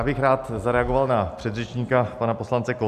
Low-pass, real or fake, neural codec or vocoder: 14.4 kHz; real; none